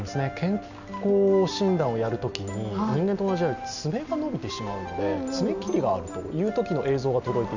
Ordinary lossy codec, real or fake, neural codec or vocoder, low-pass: none; real; none; 7.2 kHz